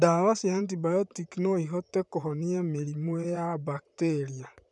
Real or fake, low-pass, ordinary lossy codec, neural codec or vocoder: fake; 10.8 kHz; none; vocoder, 44.1 kHz, 128 mel bands, Pupu-Vocoder